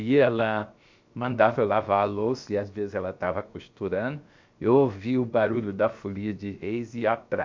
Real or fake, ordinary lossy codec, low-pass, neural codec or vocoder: fake; MP3, 48 kbps; 7.2 kHz; codec, 16 kHz, about 1 kbps, DyCAST, with the encoder's durations